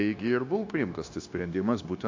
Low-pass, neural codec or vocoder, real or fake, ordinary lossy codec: 7.2 kHz; codec, 16 kHz, 0.9 kbps, LongCat-Audio-Codec; fake; AAC, 48 kbps